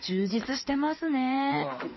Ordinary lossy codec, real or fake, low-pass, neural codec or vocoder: MP3, 24 kbps; fake; 7.2 kHz; codec, 16 kHz in and 24 kHz out, 2.2 kbps, FireRedTTS-2 codec